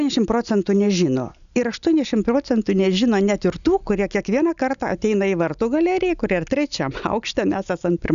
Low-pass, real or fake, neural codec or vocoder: 7.2 kHz; real; none